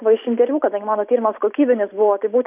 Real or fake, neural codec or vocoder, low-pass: real; none; 3.6 kHz